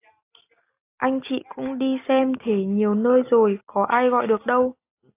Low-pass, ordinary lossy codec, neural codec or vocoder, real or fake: 3.6 kHz; AAC, 24 kbps; none; real